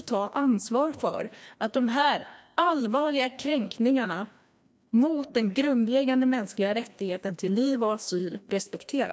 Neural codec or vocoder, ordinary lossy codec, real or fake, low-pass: codec, 16 kHz, 1 kbps, FreqCodec, larger model; none; fake; none